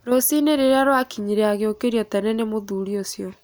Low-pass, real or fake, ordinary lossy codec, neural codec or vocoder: none; real; none; none